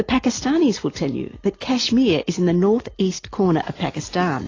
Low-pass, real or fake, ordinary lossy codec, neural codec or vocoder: 7.2 kHz; real; AAC, 32 kbps; none